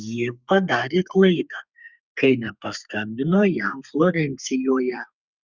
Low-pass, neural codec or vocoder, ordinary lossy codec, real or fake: 7.2 kHz; codec, 32 kHz, 1.9 kbps, SNAC; Opus, 64 kbps; fake